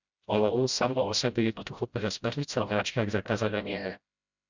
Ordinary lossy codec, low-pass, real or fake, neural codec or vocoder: Opus, 64 kbps; 7.2 kHz; fake; codec, 16 kHz, 0.5 kbps, FreqCodec, smaller model